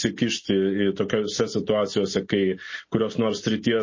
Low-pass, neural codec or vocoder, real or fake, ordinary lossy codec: 7.2 kHz; none; real; MP3, 32 kbps